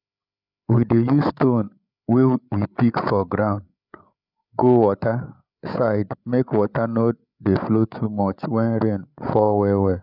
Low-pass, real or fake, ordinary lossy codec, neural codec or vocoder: 5.4 kHz; fake; none; codec, 16 kHz, 8 kbps, FreqCodec, larger model